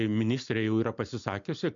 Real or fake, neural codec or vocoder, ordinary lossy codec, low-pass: real; none; MP3, 64 kbps; 7.2 kHz